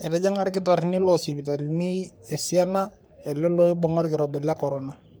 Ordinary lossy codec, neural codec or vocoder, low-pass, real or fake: none; codec, 44.1 kHz, 3.4 kbps, Pupu-Codec; none; fake